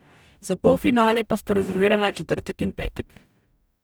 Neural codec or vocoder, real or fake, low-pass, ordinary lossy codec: codec, 44.1 kHz, 0.9 kbps, DAC; fake; none; none